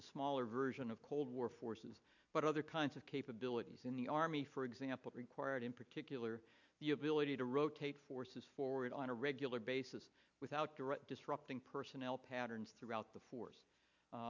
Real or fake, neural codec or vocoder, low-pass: real; none; 7.2 kHz